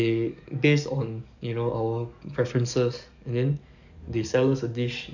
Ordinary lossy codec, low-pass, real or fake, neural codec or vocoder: none; 7.2 kHz; fake; codec, 44.1 kHz, 7.8 kbps, DAC